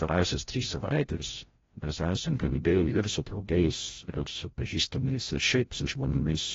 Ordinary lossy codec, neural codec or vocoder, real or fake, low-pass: AAC, 24 kbps; codec, 16 kHz, 0.5 kbps, FreqCodec, larger model; fake; 7.2 kHz